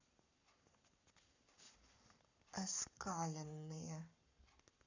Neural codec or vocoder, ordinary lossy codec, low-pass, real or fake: codec, 44.1 kHz, 7.8 kbps, Pupu-Codec; none; 7.2 kHz; fake